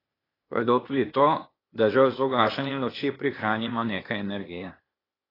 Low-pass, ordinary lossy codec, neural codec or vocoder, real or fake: 5.4 kHz; AAC, 24 kbps; codec, 16 kHz, 0.8 kbps, ZipCodec; fake